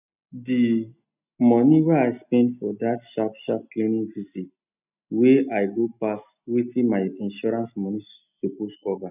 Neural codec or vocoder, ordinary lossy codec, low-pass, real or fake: none; none; 3.6 kHz; real